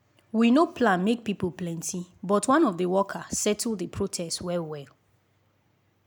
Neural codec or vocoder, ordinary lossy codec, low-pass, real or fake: none; none; none; real